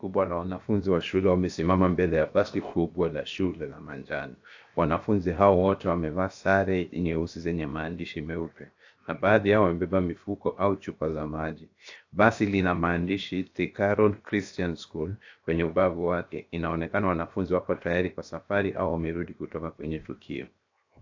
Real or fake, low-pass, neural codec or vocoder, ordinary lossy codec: fake; 7.2 kHz; codec, 16 kHz, 0.7 kbps, FocalCodec; AAC, 48 kbps